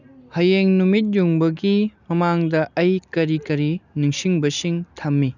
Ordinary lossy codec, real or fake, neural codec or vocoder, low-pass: none; real; none; 7.2 kHz